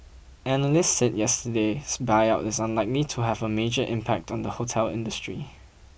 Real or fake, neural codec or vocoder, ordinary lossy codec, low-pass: real; none; none; none